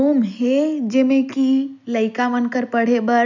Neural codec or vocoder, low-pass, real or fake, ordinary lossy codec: none; 7.2 kHz; real; none